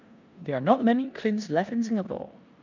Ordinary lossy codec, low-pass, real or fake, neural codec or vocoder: none; 7.2 kHz; fake; codec, 16 kHz in and 24 kHz out, 0.9 kbps, LongCat-Audio-Codec, four codebook decoder